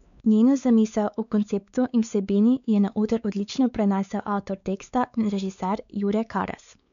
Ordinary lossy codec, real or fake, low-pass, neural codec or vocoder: MP3, 96 kbps; fake; 7.2 kHz; codec, 16 kHz, 4 kbps, X-Codec, WavLM features, trained on Multilingual LibriSpeech